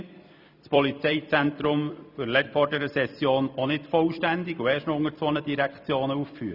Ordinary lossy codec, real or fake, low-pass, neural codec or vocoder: none; fake; 5.4 kHz; vocoder, 44.1 kHz, 128 mel bands every 256 samples, BigVGAN v2